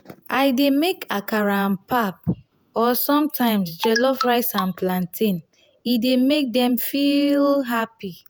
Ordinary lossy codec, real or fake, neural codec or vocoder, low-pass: none; fake; vocoder, 48 kHz, 128 mel bands, Vocos; none